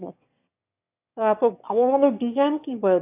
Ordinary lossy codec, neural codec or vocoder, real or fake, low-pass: AAC, 32 kbps; autoencoder, 22.05 kHz, a latent of 192 numbers a frame, VITS, trained on one speaker; fake; 3.6 kHz